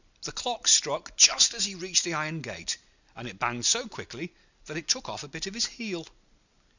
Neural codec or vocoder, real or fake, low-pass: none; real; 7.2 kHz